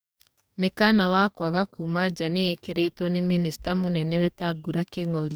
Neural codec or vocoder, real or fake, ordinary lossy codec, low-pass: codec, 44.1 kHz, 2.6 kbps, DAC; fake; none; none